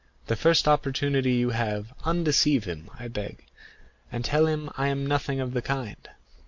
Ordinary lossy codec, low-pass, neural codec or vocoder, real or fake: MP3, 48 kbps; 7.2 kHz; none; real